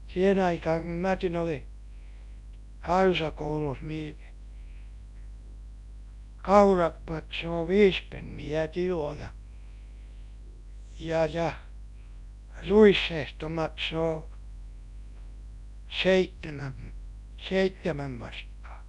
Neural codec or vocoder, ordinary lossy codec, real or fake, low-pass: codec, 24 kHz, 0.9 kbps, WavTokenizer, large speech release; MP3, 96 kbps; fake; 10.8 kHz